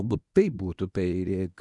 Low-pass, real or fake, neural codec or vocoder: 10.8 kHz; fake; codec, 24 kHz, 0.9 kbps, WavTokenizer, medium speech release version 1